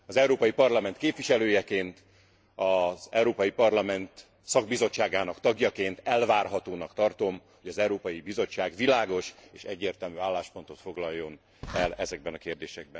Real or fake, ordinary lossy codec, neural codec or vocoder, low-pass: real; none; none; none